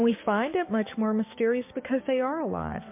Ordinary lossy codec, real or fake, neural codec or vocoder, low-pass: MP3, 24 kbps; fake; codec, 16 kHz, 6 kbps, DAC; 3.6 kHz